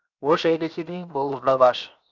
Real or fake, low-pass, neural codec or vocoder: fake; 7.2 kHz; codec, 16 kHz, 0.7 kbps, FocalCodec